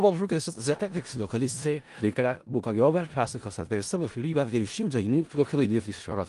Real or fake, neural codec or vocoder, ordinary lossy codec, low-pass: fake; codec, 16 kHz in and 24 kHz out, 0.4 kbps, LongCat-Audio-Codec, four codebook decoder; Opus, 24 kbps; 10.8 kHz